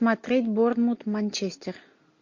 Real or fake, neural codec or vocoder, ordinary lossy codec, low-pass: real; none; MP3, 48 kbps; 7.2 kHz